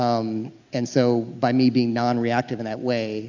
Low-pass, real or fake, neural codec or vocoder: 7.2 kHz; real; none